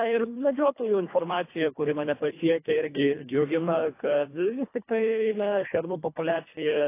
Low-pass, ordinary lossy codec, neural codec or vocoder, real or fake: 3.6 kHz; AAC, 24 kbps; codec, 24 kHz, 1.5 kbps, HILCodec; fake